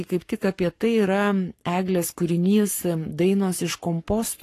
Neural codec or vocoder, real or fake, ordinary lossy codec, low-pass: codec, 44.1 kHz, 7.8 kbps, Pupu-Codec; fake; AAC, 48 kbps; 14.4 kHz